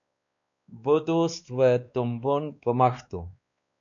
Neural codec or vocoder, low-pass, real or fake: codec, 16 kHz, 2 kbps, X-Codec, WavLM features, trained on Multilingual LibriSpeech; 7.2 kHz; fake